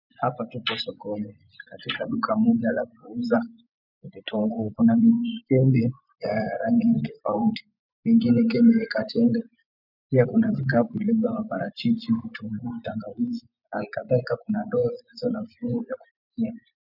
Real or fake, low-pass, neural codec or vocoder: fake; 5.4 kHz; vocoder, 24 kHz, 100 mel bands, Vocos